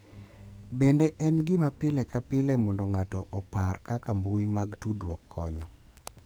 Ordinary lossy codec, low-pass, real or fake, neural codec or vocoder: none; none; fake; codec, 44.1 kHz, 2.6 kbps, SNAC